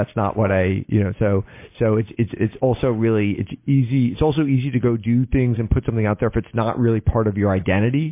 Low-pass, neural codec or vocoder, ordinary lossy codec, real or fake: 3.6 kHz; none; MP3, 24 kbps; real